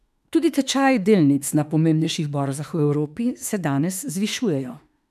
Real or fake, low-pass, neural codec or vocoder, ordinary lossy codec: fake; 14.4 kHz; autoencoder, 48 kHz, 32 numbers a frame, DAC-VAE, trained on Japanese speech; none